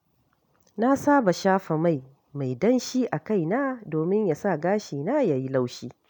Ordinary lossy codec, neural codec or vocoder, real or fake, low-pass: none; none; real; 19.8 kHz